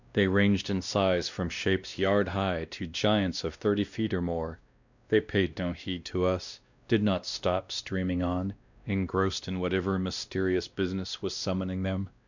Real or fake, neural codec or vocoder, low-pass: fake; codec, 16 kHz, 1 kbps, X-Codec, WavLM features, trained on Multilingual LibriSpeech; 7.2 kHz